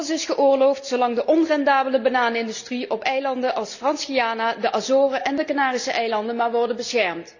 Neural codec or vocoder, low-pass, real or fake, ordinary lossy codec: none; 7.2 kHz; real; none